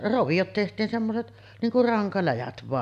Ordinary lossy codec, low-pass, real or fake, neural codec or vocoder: none; 14.4 kHz; real; none